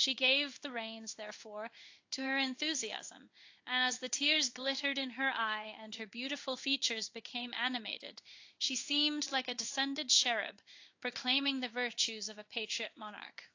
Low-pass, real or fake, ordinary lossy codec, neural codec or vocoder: 7.2 kHz; fake; AAC, 48 kbps; codec, 16 kHz in and 24 kHz out, 1 kbps, XY-Tokenizer